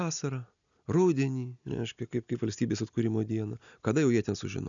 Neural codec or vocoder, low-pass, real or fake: none; 7.2 kHz; real